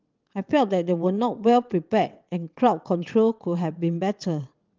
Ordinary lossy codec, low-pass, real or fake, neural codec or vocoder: Opus, 24 kbps; 7.2 kHz; fake; vocoder, 22.05 kHz, 80 mel bands, WaveNeXt